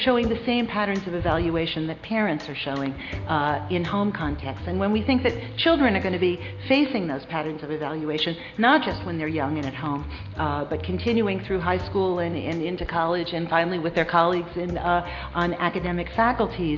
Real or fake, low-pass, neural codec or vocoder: real; 7.2 kHz; none